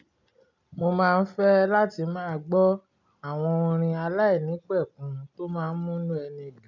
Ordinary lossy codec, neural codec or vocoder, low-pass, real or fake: none; none; 7.2 kHz; real